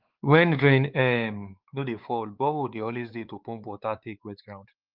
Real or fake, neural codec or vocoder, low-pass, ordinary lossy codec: fake; codec, 16 kHz, 4 kbps, X-Codec, WavLM features, trained on Multilingual LibriSpeech; 5.4 kHz; Opus, 32 kbps